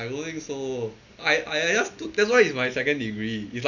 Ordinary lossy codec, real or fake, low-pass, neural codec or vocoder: none; real; 7.2 kHz; none